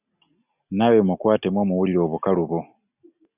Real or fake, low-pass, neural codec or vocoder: real; 3.6 kHz; none